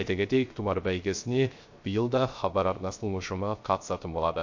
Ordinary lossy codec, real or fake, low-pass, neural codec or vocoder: MP3, 48 kbps; fake; 7.2 kHz; codec, 16 kHz, 0.3 kbps, FocalCodec